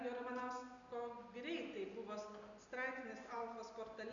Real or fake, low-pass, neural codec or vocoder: real; 7.2 kHz; none